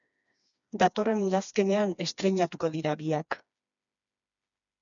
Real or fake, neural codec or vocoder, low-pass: fake; codec, 16 kHz, 2 kbps, FreqCodec, smaller model; 7.2 kHz